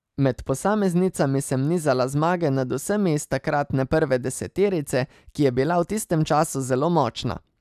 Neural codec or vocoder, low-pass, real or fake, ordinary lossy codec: none; 14.4 kHz; real; none